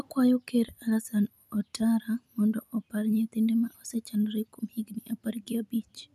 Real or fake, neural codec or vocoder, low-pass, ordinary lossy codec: real; none; 14.4 kHz; none